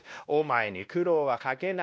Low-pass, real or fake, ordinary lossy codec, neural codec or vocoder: none; fake; none; codec, 16 kHz, 1 kbps, X-Codec, WavLM features, trained on Multilingual LibriSpeech